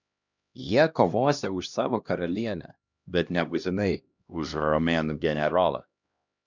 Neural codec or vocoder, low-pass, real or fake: codec, 16 kHz, 1 kbps, X-Codec, HuBERT features, trained on LibriSpeech; 7.2 kHz; fake